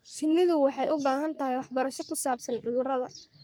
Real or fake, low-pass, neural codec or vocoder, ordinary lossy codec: fake; none; codec, 44.1 kHz, 3.4 kbps, Pupu-Codec; none